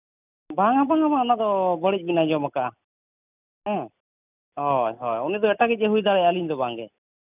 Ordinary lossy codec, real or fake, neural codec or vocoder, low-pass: none; real; none; 3.6 kHz